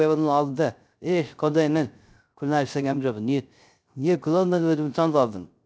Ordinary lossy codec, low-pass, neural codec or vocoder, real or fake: none; none; codec, 16 kHz, 0.3 kbps, FocalCodec; fake